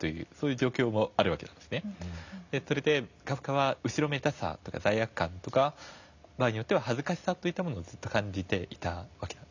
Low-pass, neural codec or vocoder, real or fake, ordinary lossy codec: 7.2 kHz; none; real; none